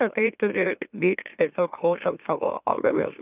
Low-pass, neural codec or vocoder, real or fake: 3.6 kHz; autoencoder, 44.1 kHz, a latent of 192 numbers a frame, MeloTTS; fake